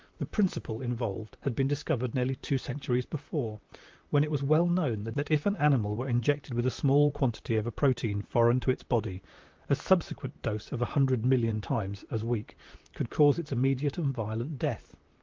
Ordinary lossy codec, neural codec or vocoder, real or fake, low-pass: Opus, 32 kbps; vocoder, 44.1 kHz, 128 mel bands, Pupu-Vocoder; fake; 7.2 kHz